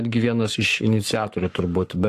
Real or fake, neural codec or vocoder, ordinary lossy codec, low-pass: fake; codec, 44.1 kHz, 7.8 kbps, Pupu-Codec; AAC, 64 kbps; 14.4 kHz